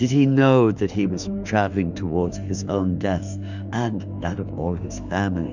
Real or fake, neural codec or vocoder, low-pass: fake; autoencoder, 48 kHz, 32 numbers a frame, DAC-VAE, trained on Japanese speech; 7.2 kHz